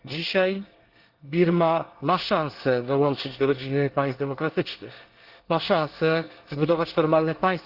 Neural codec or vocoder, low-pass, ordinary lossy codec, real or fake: codec, 24 kHz, 1 kbps, SNAC; 5.4 kHz; Opus, 16 kbps; fake